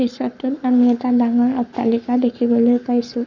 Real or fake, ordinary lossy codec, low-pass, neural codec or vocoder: fake; none; 7.2 kHz; codec, 44.1 kHz, 7.8 kbps, Pupu-Codec